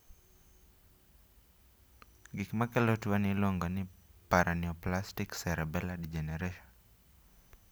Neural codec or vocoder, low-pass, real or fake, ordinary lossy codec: none; none; real; none